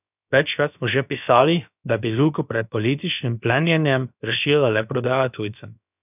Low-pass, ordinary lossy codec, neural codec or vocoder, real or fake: 3.6 kHz; none; codec, 16 kHz, about 1 kbps, DyCAST, with the encoder's durations; fake